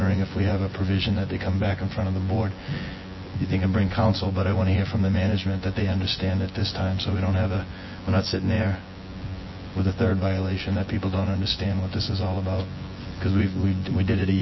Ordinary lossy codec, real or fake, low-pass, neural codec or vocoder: MP3, 24 kbps; fake; 7.2 kHz; vocoder, 24 kHz, 100 mel bands, Vocos